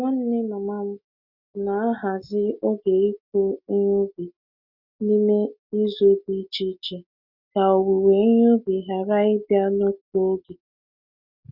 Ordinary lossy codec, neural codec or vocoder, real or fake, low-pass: none; none; real; 5.4 kHz